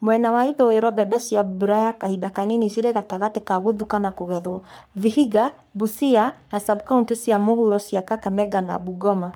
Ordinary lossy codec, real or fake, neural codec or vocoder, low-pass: none; fake; codec, 44.1 kHz, 3.4 kbps, Pupu-Codec; none